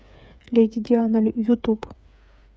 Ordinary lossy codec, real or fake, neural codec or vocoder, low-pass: none; fake; codec, 16 kHz, 8 kbps, FreqCodec, smaller model; none